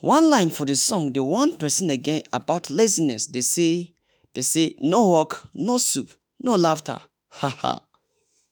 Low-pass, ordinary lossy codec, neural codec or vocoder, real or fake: none; none; autoencoder, 48 kHz, 32 numbers a frame, DAC-VAE, trained on Japanese speech; fake